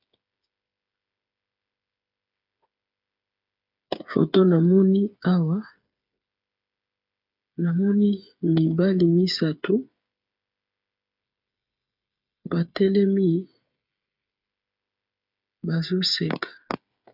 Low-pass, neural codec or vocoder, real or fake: 5.4 kHz; codec, 16 kHz, 8 kbps, FreqCodec, smaller model; fake